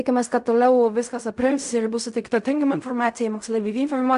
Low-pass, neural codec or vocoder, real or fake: 10.8 kHz; codec, 16 kHz in and 24 kHz out, 0.4 kbps, LongCat-Audio-Codec, fine tuned four codebook decoder; fake